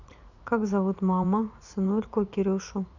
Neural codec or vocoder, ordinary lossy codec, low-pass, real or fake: vocoder, 44.1 kHz, 80 mel bands, Vocos; none; 7.2 kHz; fake